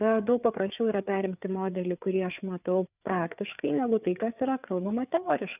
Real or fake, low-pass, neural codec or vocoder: fake; 3.6 kHz; codec, 16 kHz, 4 kbps, FreqCodec, larger model